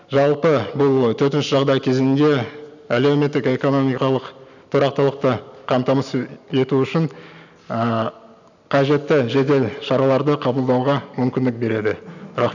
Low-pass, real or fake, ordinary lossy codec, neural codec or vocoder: 7.2 kHz; real; none; none